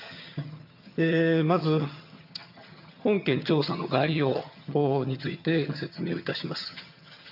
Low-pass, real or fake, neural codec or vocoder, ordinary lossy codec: 5.4 kHz; fake; vocoder, 22.05 kHz, 80 mel bands, HiFi-GAN; none